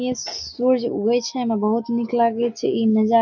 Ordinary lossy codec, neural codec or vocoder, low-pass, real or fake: none; none; 7.2 kHz; real